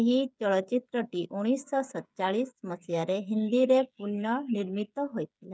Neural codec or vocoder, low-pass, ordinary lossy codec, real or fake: codec, 16 kHz, 8 kbps, FreqCodec, smaller model; none; none; fake